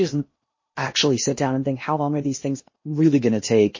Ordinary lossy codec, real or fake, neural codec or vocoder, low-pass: MP3, 32 kbps; fake; codec, 16 kHz in and 24 kHz out, 0.8 kbps, FocalCodec, streaming, 65536 codes; 7.2 kHz